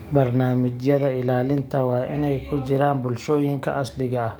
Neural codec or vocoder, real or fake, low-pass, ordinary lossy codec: codec, 44.1 kHz, 7.8 kbps, DAC; fake; none; none